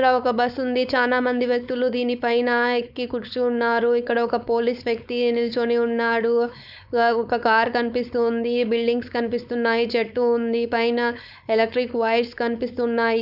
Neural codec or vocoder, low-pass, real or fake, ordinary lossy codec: codec, 16 kHz, 4.8 kbps, FACodec; 5.4 kHz; fake; none